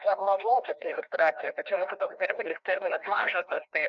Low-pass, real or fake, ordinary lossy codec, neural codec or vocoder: 7.2 kHz; fake; AAC, 64 kbps; codec, 16 kHz, 1 kbps, FreqCodec, larger model